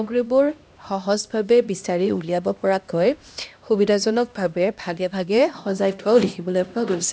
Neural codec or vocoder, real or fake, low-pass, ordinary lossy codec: codec, 16 kHz, 1 kbps, X-Codec, HuBERT features, trained on LibriSpeech; fake; none; none